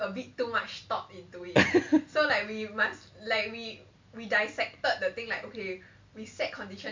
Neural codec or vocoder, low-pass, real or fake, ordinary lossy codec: none; 7.2 kHz; real; MP3, 64 kbps